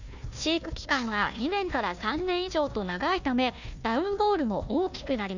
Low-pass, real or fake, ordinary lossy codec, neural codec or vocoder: 7.2 kHz; fake; none; codec, 16 kHz, 1 kbps, FunCodec, trained on Chinese and English, 50 frames a second